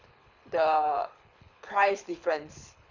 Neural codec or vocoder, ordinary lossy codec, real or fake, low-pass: codec, 24 kHz, 6 kbps, HILCodec; none; fake; 7.2 kHz